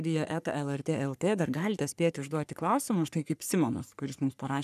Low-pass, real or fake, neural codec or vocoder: 14.4 kHz; fake; codec, 44.1 kHz, 3.4 kbps, Pupu-Codec